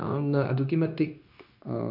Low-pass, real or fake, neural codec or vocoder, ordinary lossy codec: 5.4 kHz; fake; codec, 16 kHz, 0.9 kbps, LongCat-Audio-Codec; MP3, 48 kbps